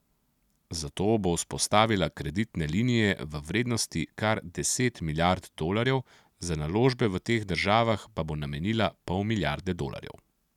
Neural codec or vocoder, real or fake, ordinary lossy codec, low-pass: none; real; none; 19.8 kHz